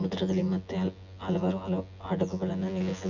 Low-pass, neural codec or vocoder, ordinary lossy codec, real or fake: 7.2 kHz; vocoder, 24 kHz, 100 mel bands, Vocos; none; fake